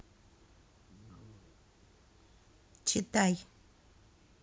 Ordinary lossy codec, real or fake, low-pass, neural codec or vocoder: none; real; none; none